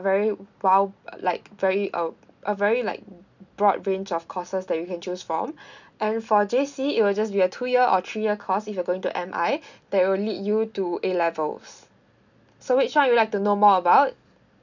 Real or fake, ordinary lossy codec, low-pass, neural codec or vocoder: real; none; 7.2 kHz; none